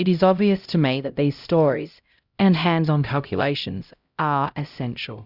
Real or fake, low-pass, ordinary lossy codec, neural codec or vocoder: fake; 5.4 kHz; Opus, 64 kbps; codec, 16 kHz, 0.5 kbps, X-Codec, HuBERT features, trained on LibriSpeech